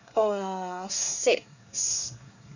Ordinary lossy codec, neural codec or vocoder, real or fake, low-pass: none; codec, 24 kHz, 1 kbps, SNAC; fake; 7.2 kHz